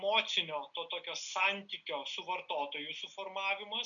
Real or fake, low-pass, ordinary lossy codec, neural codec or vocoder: real; 7.2 kHz; MP3, 96 kbps; none